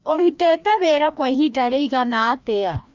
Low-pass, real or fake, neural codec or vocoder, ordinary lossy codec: 7.2 kHz; fake; codec, 16 kHz, 1 kbps, FreqCodec, larger model; MP3, 64 kbps